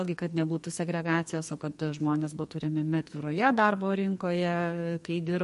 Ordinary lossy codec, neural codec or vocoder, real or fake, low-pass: MP3, 48 kbps; codec, 44.1 kHz, 2.6 kbps, SNAC; fake; 14.4 kHz